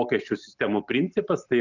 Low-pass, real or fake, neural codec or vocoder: 7.2 kHz; real; none